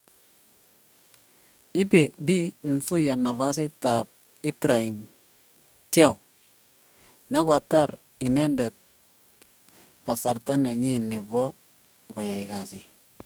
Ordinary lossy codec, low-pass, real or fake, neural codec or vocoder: none; none; fake; codec, 44.1 kHz, 2.6 kbps, DAC